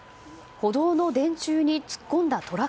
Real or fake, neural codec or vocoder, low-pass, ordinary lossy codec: real; none; none; none